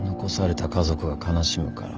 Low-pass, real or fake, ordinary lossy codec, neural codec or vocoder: 7.2 kHz; real; Opus, 24 kbps; none